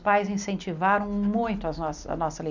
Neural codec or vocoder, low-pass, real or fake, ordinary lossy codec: none; 7.2 kHz; real; none